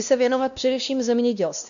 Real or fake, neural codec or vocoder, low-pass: fake; codec, 16 kHz, 1 kbps, X-Codec, WavLM features, trained on Multilingual LibriSpeech; 7.2 kHz